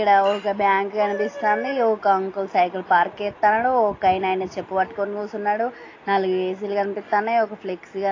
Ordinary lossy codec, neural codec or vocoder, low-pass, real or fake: AAC, 32 kbps; none; 7.2 kHz; real